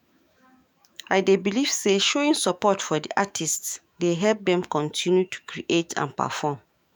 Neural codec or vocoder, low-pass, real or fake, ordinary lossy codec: autoencoder, 48 kHz, 128 numbers a frame, DAC-VAE, trained on Japanese speech; none; fake; none